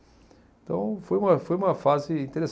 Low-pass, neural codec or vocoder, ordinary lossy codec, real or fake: none; none; none; real